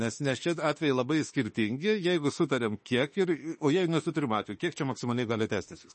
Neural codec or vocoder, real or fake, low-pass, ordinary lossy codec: autoencoder, 48 kHz, 32 numbers a frame, DAC-VAE, trained on Japanese speech; fake; 10.8 kHz; MP3, 32 kbps